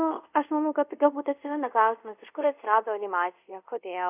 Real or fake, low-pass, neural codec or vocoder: fake; 3.6 kHz; codec, 24 kHz, 0.5 kbps, DualCodec